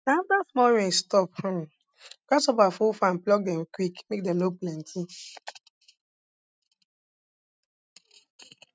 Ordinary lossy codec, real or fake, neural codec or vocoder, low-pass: none; real; none; none